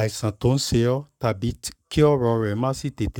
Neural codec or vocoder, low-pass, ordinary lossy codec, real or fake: codec, 44.1 kHz, 7.8 kbps, Pupu-Codec; 19.8 kHz; none; fake